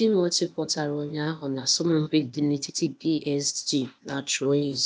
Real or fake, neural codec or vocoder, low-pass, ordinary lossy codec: fake; codec, 16 kHz, 0.8 kbps, ZipCodec; none; none